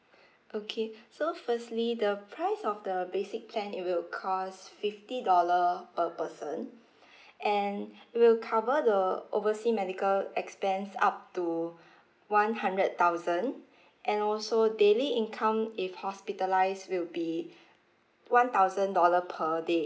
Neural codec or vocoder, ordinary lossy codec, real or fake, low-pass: none; none; real; none